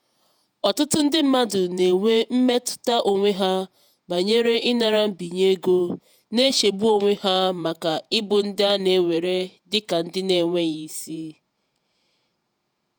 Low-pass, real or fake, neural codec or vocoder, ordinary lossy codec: none; fake; vocoder, 48 kHz, 128 mel bands, Vocos; none